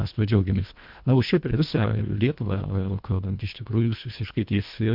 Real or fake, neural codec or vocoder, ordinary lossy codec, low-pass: fake; codec, 24 kHz, 1.5 kbps, HILCodec; MP3, 48 kbps; 5.4 kHz